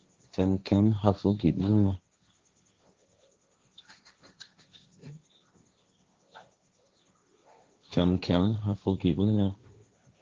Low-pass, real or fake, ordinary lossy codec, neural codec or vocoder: 7.2 kHz; fake; Opus, 32 kbps; codec, 16 kHz, 1.1 kbps, Voila-Tokenizer